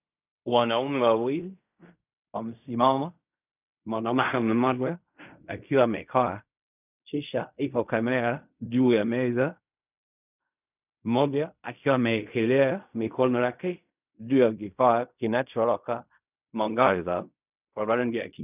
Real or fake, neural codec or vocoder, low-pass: fake; codec, 16 kHz in and 24 kHz out, 0.4 kbps, LongCat-Audio-Codec, fine tuned four codebook decoder; 3.6 kHz